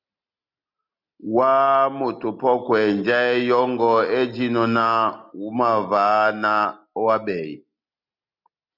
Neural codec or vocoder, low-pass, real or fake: none; 5.4 kHz; real